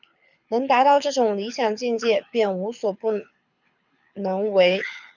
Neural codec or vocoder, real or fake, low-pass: codec, 24 kHz, 6 kbps, HILCodec; fake; 7.2 kHz